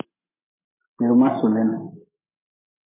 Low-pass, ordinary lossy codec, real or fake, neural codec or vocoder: 3.6 kHz; MP3, 16 kbps; real; none